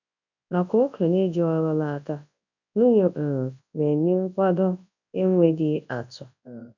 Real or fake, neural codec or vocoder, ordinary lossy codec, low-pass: fake; codec, 24 kHz, 0.9 kbps, WavTokenizer, large speech release; none; 7.2 kHz